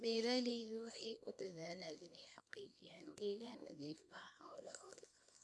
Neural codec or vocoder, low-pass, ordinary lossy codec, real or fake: codec, 24 kHz, 0.9 kbps, WavTokenizer, small release; none; none; fake